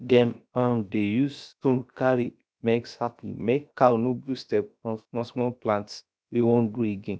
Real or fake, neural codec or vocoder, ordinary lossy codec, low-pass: fake; codec, 16 kHz, about 1 kbps, DyCAST, with the encoder's durations; none; none